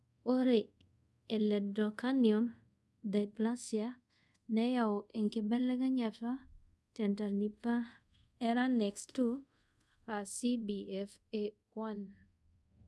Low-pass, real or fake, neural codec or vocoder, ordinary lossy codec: none; fake; codec, 24 kHz, 0.5 kbps, DualCodec; none